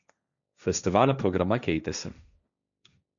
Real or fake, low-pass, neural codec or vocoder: fake; 7.2 kHz; codec, 16 kHz, 1.1 kbps, Voila-Tokenizer